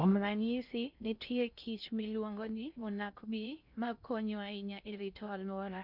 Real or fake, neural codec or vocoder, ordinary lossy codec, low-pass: fake; codec, 16 kHz in and 24 kHz out, 0.6 kbps, FocalCodec, streaming, 2048 codes; none; 5.4 kHz